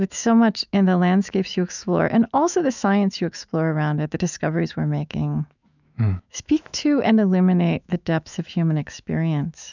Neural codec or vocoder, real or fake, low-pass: autoencoder, 48 kHz, 128 numbers a frame, DAC-VAE, trained on Japanese speech; fake; 7.2 kHz